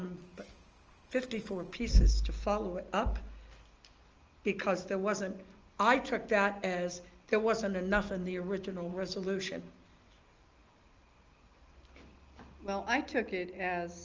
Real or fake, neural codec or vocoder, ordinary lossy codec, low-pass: real; none; Opus, 24 kbps; 7.2 kHz